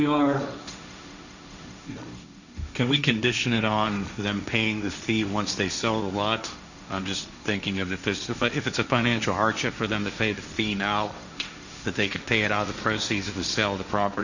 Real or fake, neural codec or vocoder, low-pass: fake; codec, 16 kHz, 1.1 kbps, Voila-Tokenizer; 7.2 kHz